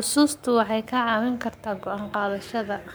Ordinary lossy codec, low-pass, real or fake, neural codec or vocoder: none; none; fake; codec, 44.1 kHz, 7.8 kbps, Pupu-Codec